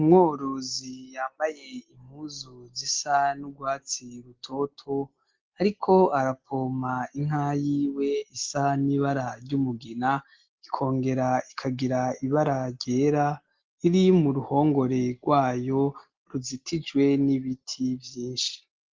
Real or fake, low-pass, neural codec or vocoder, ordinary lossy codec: real; 7.2 kHz; none; Opus, 16 kbps